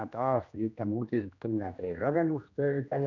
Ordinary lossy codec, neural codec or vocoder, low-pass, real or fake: none; codec, 16 kHz, 1 kbps, X-Codec, HuBERT features, trained on general audio; 7.2 kHz; fake